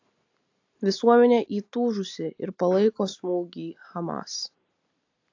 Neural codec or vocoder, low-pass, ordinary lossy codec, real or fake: none; 7.2 kHz; AAC, 48 kbps; real